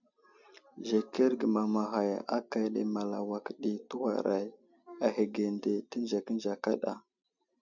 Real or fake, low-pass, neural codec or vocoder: real; 7.2 kHz; none